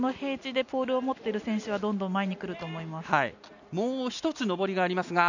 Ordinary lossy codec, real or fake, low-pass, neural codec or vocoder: none; real; 7.2 kHz; none